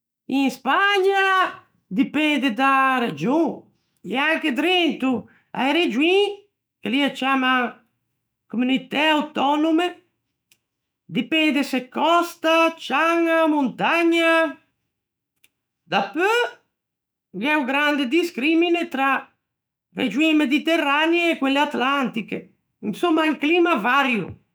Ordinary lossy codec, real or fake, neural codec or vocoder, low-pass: none; fake; autoencoder, 48 kHz, 128 numbers a frame, DAC-VAE, trained on Japanese speech; none